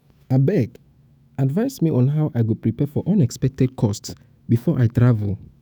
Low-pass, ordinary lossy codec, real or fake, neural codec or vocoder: none; none; fake; autoencoder, 48 kHz, 128 numbers a frame, DAC-VAE, trained on Japanese speech